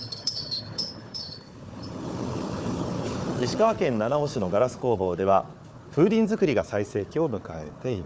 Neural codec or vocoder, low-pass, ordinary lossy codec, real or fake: codec, 16 kHz, 4 kbps, FunCodec, trained on Chinese and English, 50 frames a second; none; none; fake